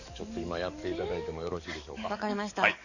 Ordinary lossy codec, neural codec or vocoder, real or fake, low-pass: none; codec, 44.1 kHz, 7.8 kbps, DAC; fake; 7.2 kHz